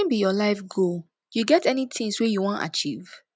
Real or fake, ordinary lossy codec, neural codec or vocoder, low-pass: real; none; none; none